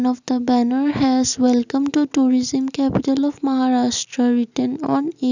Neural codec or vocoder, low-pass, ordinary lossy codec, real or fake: none; 7.2 kHz; none; real